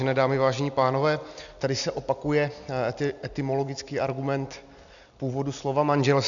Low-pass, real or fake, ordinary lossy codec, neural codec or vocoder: 7.2 kHz; real; MP3, 96 kbps; none